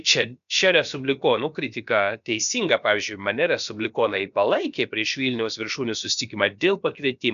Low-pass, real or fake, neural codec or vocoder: 7.2 kHz; fake; codec, 16 kHz, about 1 kbps, DyCAST, with the encoder's durations